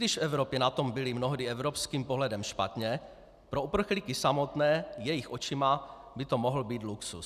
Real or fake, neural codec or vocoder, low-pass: real; none; 14.4 kHz